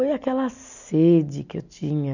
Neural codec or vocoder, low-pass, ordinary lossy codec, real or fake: none; 7.2 kHz; none; real